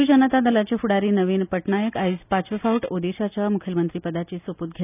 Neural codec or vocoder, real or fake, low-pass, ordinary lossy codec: none; real; 3.6 kHz; none